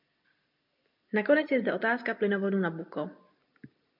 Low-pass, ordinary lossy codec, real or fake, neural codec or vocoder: 5.4 kHz; MP3, 48 kbps; real; none